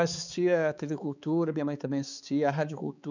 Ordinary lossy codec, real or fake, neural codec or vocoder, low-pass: none; fake; codec, 16 kHz, 4 kbps, X-Codec, HuBERT features, trained on balanced general audio; 7.2 kHz